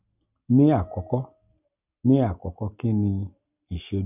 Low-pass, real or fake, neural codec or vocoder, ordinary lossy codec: 3.6 kHz; real; none; none